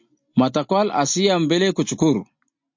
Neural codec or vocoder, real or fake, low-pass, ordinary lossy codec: none; real; 7.2 kHz; MP3, 48 kbps